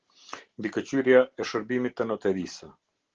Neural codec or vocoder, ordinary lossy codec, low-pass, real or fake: none; Opus, 16 kbps; 7.2 kHz; real